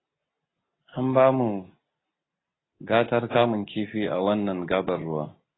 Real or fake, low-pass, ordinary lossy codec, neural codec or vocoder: real; 7.2 kHz; AAC, 16 kbps; none